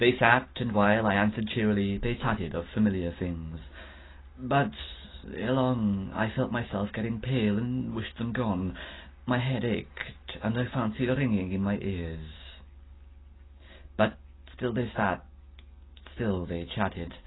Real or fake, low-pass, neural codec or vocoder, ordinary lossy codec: real; 7.2 kHz; none; AAC, 16 kbps